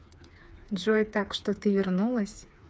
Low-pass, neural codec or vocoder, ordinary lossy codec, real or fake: none; codec, 16 kHz, 4 kbps, FreqCodec, smaller model; none; fake